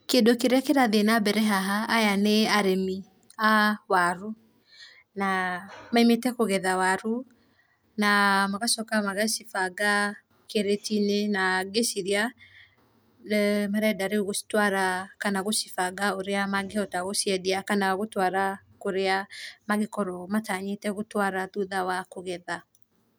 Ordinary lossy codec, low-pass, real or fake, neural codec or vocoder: none; none; real; none